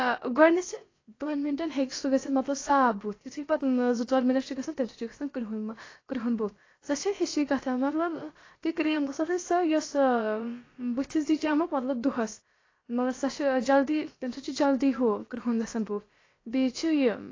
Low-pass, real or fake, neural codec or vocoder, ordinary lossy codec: 7.2 kHz; fake; codec, 16 kHz, about 1 kbps, DyCAST, with the encoder's durations; AAC, 32 kbps